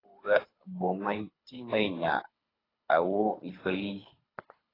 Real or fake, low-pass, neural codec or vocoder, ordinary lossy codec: fake; 5.4 kHz; codec, 24 kHz, 3 kbps, HILCodec; AAC, 24 kbps